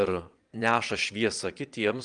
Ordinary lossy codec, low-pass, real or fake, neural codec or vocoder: Opus, 32 kbps; 9.9 kHz; fake; vocoder, 22.05 kHz, 80 mel bands, Vocos